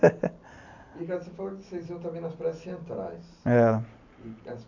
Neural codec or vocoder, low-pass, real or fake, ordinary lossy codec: none; 7.2 kHz; real; none